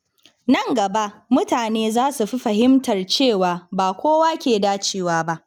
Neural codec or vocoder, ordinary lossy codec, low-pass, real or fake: none; none; 19.8 kHz; real